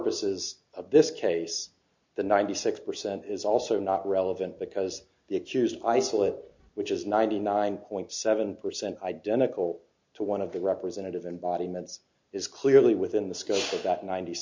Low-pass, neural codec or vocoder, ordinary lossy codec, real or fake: 7.2 kHz; none; MP3, 48 kbps; real